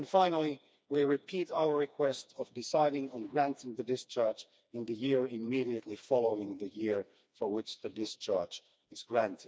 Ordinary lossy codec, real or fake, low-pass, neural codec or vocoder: none; fake; none; codec, 16 kHz, 2 kbps, FreqCodec, smaller model